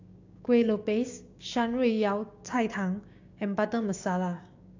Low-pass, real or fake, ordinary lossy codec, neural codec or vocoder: 7.2 kHz; fake; AAC, 48 kbps; codec, 16 kHz, 6 kbps, DAC